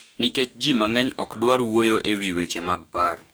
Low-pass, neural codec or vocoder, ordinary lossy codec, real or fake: none; codec, 44.1 kHz, 2.6 kbps, DAC; none; fake